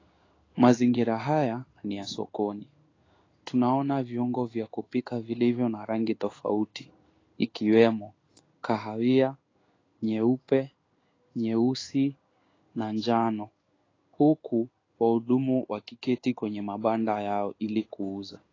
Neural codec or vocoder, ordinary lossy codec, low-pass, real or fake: codec, 16 kHz in and 24 kHz out, 1 kbps, XY-Tokenizer; AAC, 32 kbps; 7.2 kHz; fake